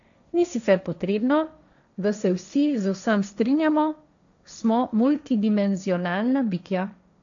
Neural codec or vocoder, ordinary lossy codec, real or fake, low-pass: codec, 16 kHz, 1.1 kbps, Voila-Tokenizer; none; fake; 7.2 kHz